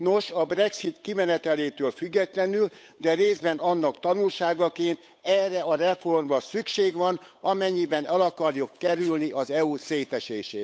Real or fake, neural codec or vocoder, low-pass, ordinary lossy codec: fake; codec, 16 kHz, 8 kbps, FunCodec, trained on Chinese and English, 25 frames a second; none; none